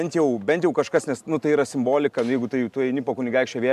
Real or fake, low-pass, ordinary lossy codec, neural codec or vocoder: real; 14.4 kHz; AAC, 96 kbps; none